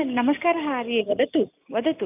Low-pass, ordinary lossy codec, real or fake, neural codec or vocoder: 3.6 kHz; none; real; none